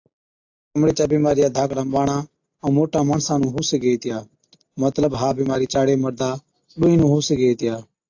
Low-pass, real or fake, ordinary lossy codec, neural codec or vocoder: 7.2 kHz; real; AAC, 48 kbps; none